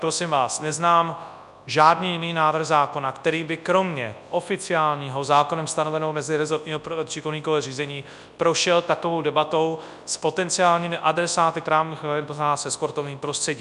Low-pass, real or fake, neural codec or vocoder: 10.8 kHz; fake; codec, 24 kHz, 0.9 kbps, WavTokenizer, large speech release